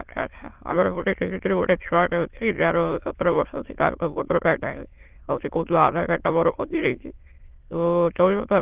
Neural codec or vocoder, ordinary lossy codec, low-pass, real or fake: autoencoder, 22.05 kHz, a latent of 192 numbers a frame, VITS, trained on many speakers; Opus, 16 kbps; 3.6 kHz; fake